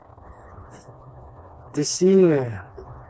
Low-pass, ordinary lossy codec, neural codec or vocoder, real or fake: none; none; codec, 16 kHz, 2 kbps, FreqCodec, smaller model; fake